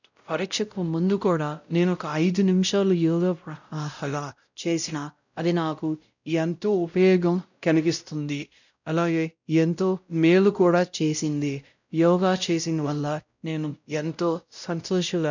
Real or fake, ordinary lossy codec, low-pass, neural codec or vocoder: fake; none; 7.2 kHz; codec, 16 kHz, 0.5 kbps, X-Codec, WavLM features, trained on Multilingual LibriSpeech